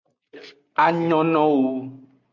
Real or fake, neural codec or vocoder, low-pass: real; none; 7.2 kHz